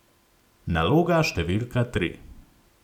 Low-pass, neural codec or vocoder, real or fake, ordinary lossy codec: 19.8 kHz; none; real; none